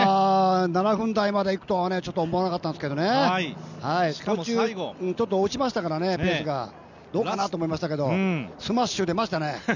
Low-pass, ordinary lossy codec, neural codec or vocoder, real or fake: 7.2 kHz; none; none; real